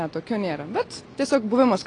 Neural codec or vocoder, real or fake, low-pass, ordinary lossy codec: none; real; 9.9 kHz; AAC, 32 kbps